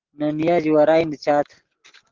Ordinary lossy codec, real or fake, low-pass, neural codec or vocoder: Opus, 16 kbps; real; 7.2 kHz; none